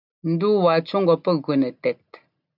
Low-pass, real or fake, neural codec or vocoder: 5.4 kHz; real; none